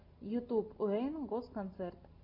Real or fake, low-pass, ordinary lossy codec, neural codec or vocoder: real; 5.4 kHz; Opus, 64 kbps; none